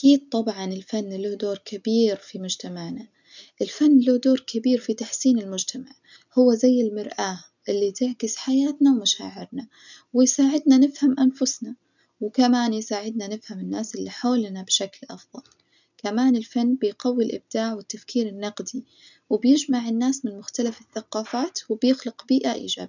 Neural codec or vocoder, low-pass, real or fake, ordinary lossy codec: none; 7.2 kHz; real; none